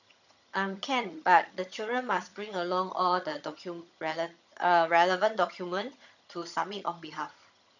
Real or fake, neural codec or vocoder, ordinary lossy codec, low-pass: fake; vocoder, 22.05 kHz, 80 mel bands, HiFi-GAN; none; 7.2 kHz